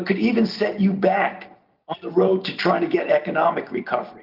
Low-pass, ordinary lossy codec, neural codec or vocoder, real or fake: 5.4 kHz; Opus, 24 kbps; none; real